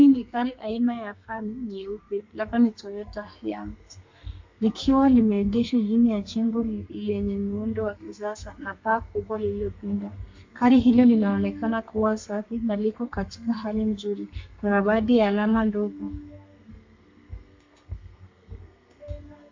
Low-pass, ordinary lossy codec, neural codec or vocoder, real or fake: 7.2 kHz; MP3, 48 kbps; codec, 32 kHz, 1.9 kbps, SNAC; fake